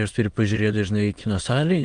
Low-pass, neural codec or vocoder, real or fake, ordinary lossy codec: 9.9 kHz; autoencoder, 22.05 kHz, a latent of 192 numbers a frame, VITS, trained on many speakers; fake; Opus, 24 kbps